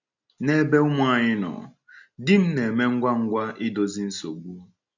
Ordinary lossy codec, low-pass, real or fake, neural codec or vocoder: none; 7.2 kHz; real; none